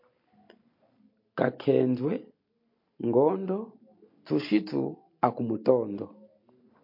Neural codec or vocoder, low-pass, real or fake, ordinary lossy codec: none; 5.4 kHz; real; MP3, 32 kbps